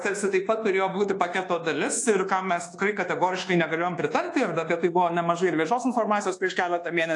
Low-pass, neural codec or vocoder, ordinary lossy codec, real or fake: 10.8 kHz; codec, 24 kHz, 1.2 kbps, DualCodec; AAC, 48 kbps; fake